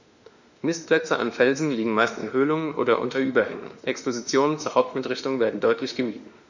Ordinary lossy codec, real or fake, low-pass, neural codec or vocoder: none; fake; 7.2 kHz; autoencoder, 48 kHz, 32 numbers a frame, DAC-VAE, trained on Japanese speech